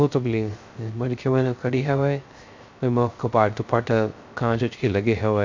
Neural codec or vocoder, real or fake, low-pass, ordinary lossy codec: codec, 16 kHz, 0.3 kbps, FocalCodec; fake; 7.2 kHz; MP3, 64 kbps